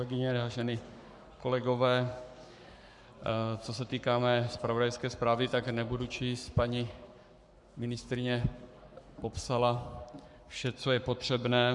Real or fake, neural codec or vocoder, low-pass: fake; codec, 44.1 kHz, 7.8 kbps, Pupu-Codec; 10.8 kHz